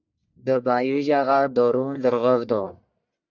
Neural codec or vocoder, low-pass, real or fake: codec, 44.1 kHz, 1.7 kbps, Pupu-Codec; 7.2 kHz; fake